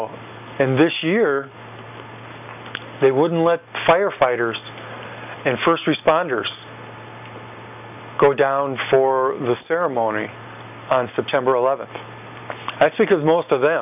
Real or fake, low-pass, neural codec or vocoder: real; 3.6 kHz; none